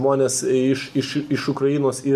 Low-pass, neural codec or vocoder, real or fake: 14.4 kHz; none; real